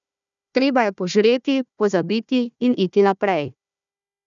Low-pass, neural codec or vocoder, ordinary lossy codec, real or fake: 7.2 kHz; codec, 16 kHz, 1 kbps, FunCodec, trained on Chinese and English, 50 frames a second; none; fake